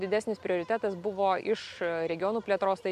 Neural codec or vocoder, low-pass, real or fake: none; 14.4 kHz; real